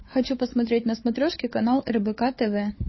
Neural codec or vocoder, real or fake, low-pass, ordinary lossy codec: codec, 16 kHz, 4 kbps, FunCodec, trained on Chinese and English, 50 frames a second; fake; 7.2 kHz; MP3, 24 kbps